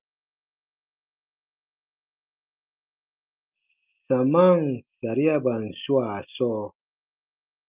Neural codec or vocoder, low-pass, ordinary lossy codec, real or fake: none; 3.6 kHz; Opus, 24 kbps; real